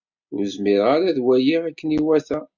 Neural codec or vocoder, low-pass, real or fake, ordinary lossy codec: none; 7.2 kHz; real; MP3, 64 kbps